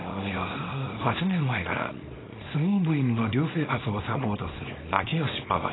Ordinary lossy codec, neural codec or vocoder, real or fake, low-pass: AAC, 16 kbps; codec, 24 kHz, 0.9 kbps, WavTokenizer, small release; fake; 7.2 kHz